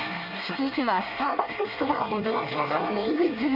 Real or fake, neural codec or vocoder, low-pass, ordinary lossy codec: fake; codec, 24 kHz, 1 kbps, SNAC; 5.4 kHz; none